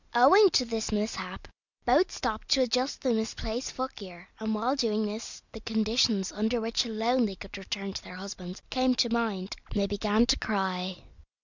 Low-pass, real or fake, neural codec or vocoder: 7.2 kHz; real; none